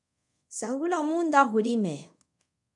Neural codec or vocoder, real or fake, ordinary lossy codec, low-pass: codec, 24 kHz, 0.5 kbps, DualCodec; fake; MP3, 96 kbps; 10.8 kHz